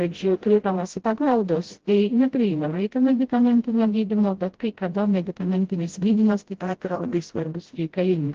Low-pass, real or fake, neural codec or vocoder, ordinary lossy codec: 7.2 kHz; fake; codec, 16 kHz, 0.5 kbps, FreqCodec, smaller model; Opus, 16 kbps